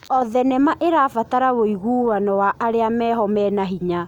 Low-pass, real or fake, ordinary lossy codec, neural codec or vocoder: 19.8 kHz; real; none; none